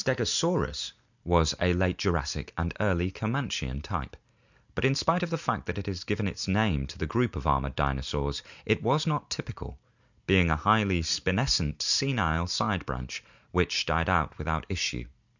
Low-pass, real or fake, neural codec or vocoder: 7.2 kHz; real; none